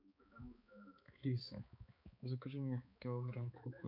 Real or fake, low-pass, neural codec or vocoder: fake; 5.4 kHz; codec, 16 kHz, 4 kbps, X-Codec, HuBERT features, trained on balanced general audio